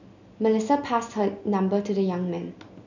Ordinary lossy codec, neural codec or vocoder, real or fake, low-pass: none; none; real; 7.2 kHz